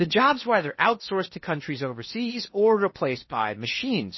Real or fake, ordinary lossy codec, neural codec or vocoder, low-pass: fake; MP3, 24 kbps; codec, 16 kHz in and 24 kHz out, 0.8 kbps, FocalCodec, streaming, 65536 codes; 7.2 kHz